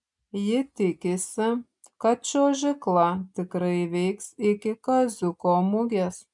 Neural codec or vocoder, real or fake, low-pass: none; real; 10.8 kHz